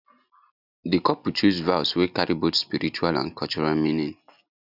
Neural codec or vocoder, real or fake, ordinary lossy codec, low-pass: none; real; none; 5.4 kHz